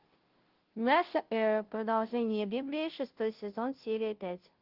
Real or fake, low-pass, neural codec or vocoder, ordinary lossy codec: fake; 5.4 kHz; codec, 16 kHz, 0.5 kbps, FunCodec, trained on Chinese and English, 25 frames a second; Opus, 16 kbps